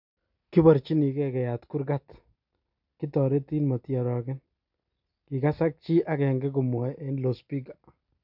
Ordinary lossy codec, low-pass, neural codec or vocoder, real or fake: none; 5.4 kHz; none; real